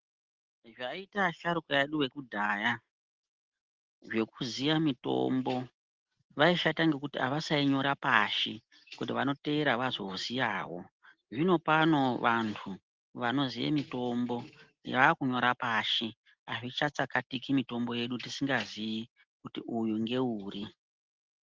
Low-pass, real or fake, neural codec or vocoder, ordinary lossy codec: 7.2 kHz; real; none; Opus, 16 kbps